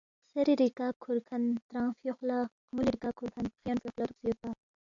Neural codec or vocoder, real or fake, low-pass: none; real; 7.2 kHz